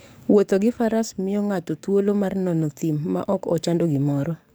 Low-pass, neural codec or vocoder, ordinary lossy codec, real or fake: none; codec, 44.1 kHz, 7.8 kbps, DAC; none; fake